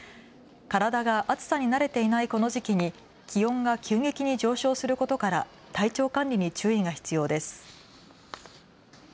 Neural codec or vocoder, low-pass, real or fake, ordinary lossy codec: none; none; real; none